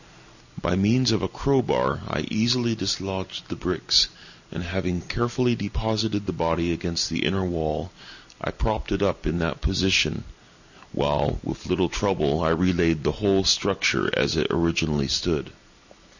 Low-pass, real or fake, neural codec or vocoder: 7.2 kHz; real; none